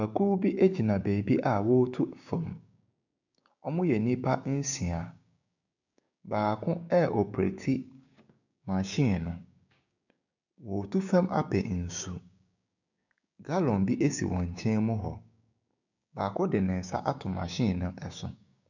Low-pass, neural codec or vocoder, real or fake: 7.2 kHz; codec, 16 kHz, 6 kbps, DAC; fake